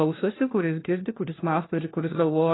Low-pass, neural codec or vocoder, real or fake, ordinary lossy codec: 7.2 kHz; codec, 16 kHz, 1 kbps, FunCodec, trained on LibriTTS, 50 frames a second; fake; AAC, 16 kbps